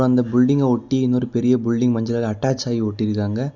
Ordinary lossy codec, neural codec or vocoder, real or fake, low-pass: none; none; real; 7.2 kHz